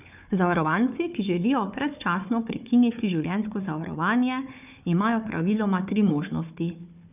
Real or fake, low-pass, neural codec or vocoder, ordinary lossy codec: fake; 3.6 kHz; codec, 16 kHz, 4 kbps, FunCodec, trained on Chinese and English, 50 frames a second; none